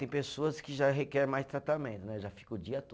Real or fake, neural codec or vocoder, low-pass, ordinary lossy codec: real; none; none; none